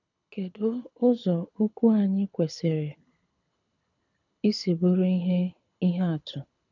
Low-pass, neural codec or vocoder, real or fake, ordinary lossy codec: 7.2 kHz; codec, 24 kHz, 6 kbps, HILCodec; fake; none